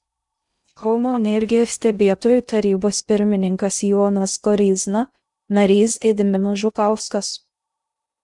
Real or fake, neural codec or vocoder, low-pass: fake; codec, 16 kHz in and 24 kHz out, 0.6 kbps, FocalCodec, streaming, 2048 codes; 10.8 kHz